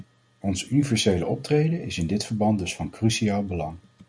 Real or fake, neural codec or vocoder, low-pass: real; none; 9.9 kHz